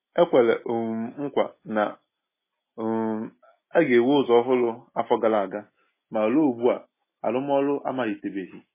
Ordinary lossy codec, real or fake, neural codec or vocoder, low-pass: MP3, 16 kbps; real; none; 3.6 kHz